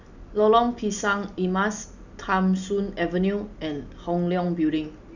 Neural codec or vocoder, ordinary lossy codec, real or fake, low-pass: none; none; real; 7.2 kHz